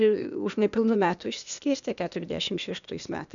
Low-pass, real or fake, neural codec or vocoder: 7.2 kHz; fake; codec, 16 kHz, 0.8 kbps, ZipCodec